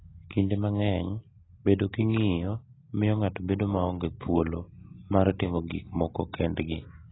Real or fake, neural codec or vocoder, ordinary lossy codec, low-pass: real; none; AAC, 16 kbps; 7.2 kHz